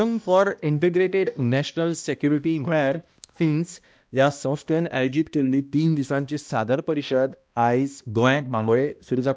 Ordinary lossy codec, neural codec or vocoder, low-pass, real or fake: none; codec, 16 kHz, 1 kbps, X-Codec, HuBERT features, trained on balanced general audio; none; fake